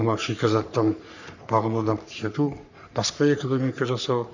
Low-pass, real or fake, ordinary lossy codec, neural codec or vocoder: 7.2 kHz; fake; none; codec, 44.1 kHz, 3.4 kbps, Pupu-Codec